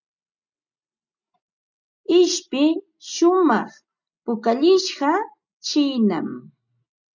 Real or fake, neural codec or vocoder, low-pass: real; none; 7.2 kHz